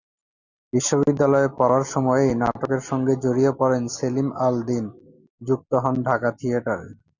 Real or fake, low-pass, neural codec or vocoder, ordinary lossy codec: real; 7.2 kHz; none; Opus, 64 kbps